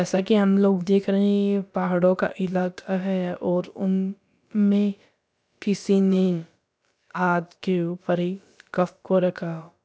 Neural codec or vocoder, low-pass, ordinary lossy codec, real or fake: codec, 16 kHz, about 1 kbps, DyCAST, with the encoder's durations; none; none; fake